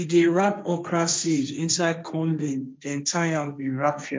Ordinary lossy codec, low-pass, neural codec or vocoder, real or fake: none; none; codec, 16 kHz, 1.1 kbps, Voila-Tokenizer; fake